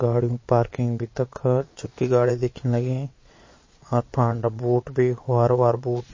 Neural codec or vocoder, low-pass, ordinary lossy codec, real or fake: vocoder, 22.05 kHz, 80 mel bands, Vocos; 7.2 kHz; MP3, 32 kbps; fake